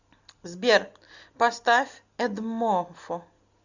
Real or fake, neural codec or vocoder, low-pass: real; none; 7.2 kHz